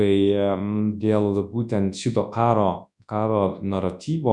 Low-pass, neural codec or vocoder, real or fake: 10.8 kHz; codec, 24 kHz, 0.9 kbps, WavTokenizer, large speech release; fake